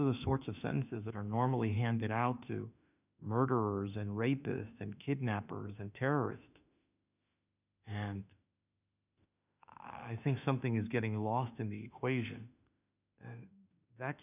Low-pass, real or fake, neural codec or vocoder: 3.6 kHz; fake; autoencoder, 48 kHz, 32 numbers a frame, DAC-VAE, trained on Japanese speech